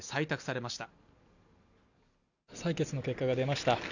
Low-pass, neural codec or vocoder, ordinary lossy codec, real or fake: 7.2 kHz; none; none; real